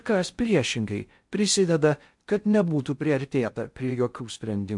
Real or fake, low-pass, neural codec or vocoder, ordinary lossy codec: fake; 10.8 kHz; codec, 16 kHz in and 24 kHz out, 0.6 kbps, FocalCodec, streaming, 4096 codes; MP3, 64 kbps